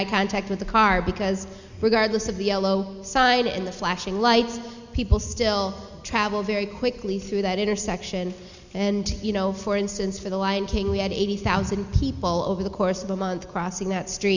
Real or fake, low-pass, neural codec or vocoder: real; 7.2 kHz; none